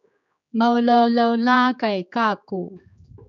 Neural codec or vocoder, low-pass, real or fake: codec, 16 kHz, 4 kbps, X-Codec, HuBERT features, trained on general audio; 7.2 kHz; fake